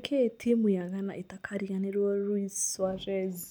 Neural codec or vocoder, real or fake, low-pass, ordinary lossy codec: none; real; none; none